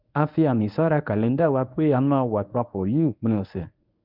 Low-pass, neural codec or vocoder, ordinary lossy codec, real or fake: 5.4 kHz; codec, 24 kHz, 0.9 kbps, WavTokenizer, medium speech release version 1; none; fake